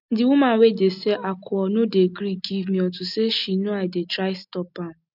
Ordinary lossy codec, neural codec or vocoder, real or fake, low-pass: none; none; real; 5.4 kHz